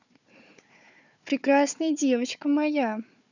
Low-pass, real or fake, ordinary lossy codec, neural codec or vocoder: 7.2 kHz; fake; none; codec, 16 kHz, 4 kbps, FunCodec, trained on Chinese and English, 50 frames a second